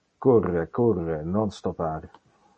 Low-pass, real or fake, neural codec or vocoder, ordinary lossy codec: 10.8 kHz; real; none; MP3, 32 kbps